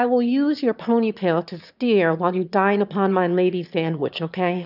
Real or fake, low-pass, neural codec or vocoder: fake; 5.4 kHz; autoencoder, 22.05 kHz, a latent of 192 numbers a frame, VITS, trained on one speaker